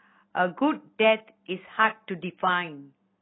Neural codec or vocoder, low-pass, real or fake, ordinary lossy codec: none; 7.2 kHz; real; AAC, 16 kbps